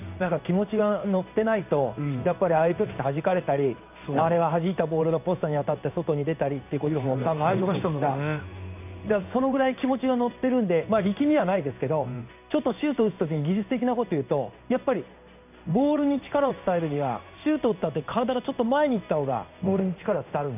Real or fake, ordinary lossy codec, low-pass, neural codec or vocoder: fake; none; 3.6 kHz; codec, 16 kHz in and 24 kHz out, 1 kbps, XY-Tokenizer